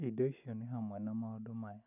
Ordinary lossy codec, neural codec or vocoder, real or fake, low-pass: none; none; real; 3.6 kHz